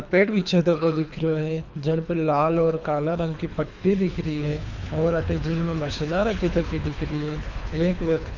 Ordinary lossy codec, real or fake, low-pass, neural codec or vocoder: none; fake; 7.2 kHz; codec, 24 kHz, 3 kbps, HILCodec